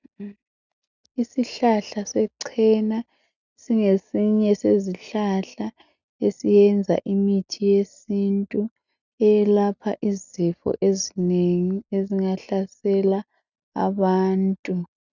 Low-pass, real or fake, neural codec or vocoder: 7.2 kHz; real; none